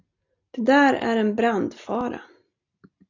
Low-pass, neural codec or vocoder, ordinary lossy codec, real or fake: 7.2 kHz; none; AAC, 48 kbps; real